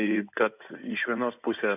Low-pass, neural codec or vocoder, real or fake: 3.6 kHz; codec, 16 kHz in and 24 kHz out, 2.2 kbps, FireRedTTS-2 codec; fake